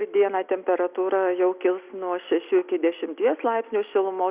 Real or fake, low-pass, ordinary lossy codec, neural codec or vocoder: real; 3.6 kHz; Opus, 64 kbps; none